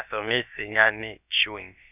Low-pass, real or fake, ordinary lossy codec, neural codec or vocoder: 3.6 kHz; fake; none; codec, 16 kHz, about 1 kbps, DyCAST, with the encoder's durations